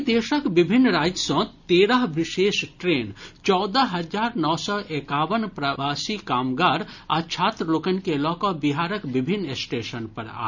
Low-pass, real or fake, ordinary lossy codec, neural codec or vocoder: 7.2 kHz; real; none; none